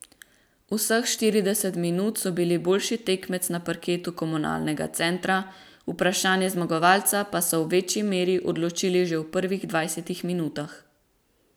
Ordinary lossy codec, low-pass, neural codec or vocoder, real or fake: none; none; none; real